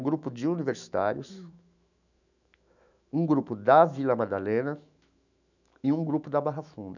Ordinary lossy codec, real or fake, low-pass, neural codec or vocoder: none; fake; 7.2 kHz; autoencoder, 48 kHz, 128 numbers a frame, DAC-VAE, trained on Japanese speech